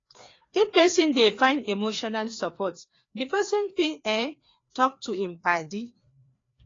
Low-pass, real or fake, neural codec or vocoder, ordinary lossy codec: 7.2 kHz; fake; codec, 16 kHz, 2 kbps, FreqCodec, larger model; AAC, 32 kbps